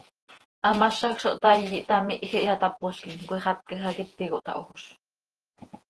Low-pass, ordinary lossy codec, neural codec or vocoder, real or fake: 10.8 kHz; Opus, 16 kbps; vocoder, 48 kHz, 128 mel bands, Vocos; fake